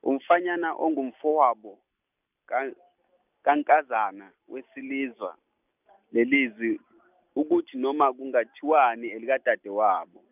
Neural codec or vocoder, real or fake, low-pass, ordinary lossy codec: none; real; 3.6 kHz; none